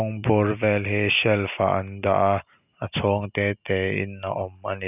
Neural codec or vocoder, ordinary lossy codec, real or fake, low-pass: none; none; real; 3.6 kHz